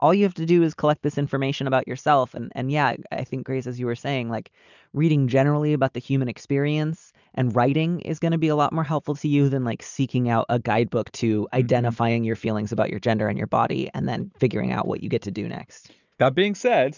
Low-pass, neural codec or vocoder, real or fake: 7.2 kHz; none; real